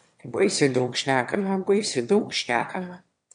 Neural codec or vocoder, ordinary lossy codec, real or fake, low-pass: autoencoder, 22.05 kHz, a latent of 192 numbers a frame, VITS, trained on one speaker; MP3, 64 kbps; fake; 9.9 kHz